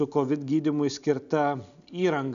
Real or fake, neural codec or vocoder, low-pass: real; none; 7.2 kHz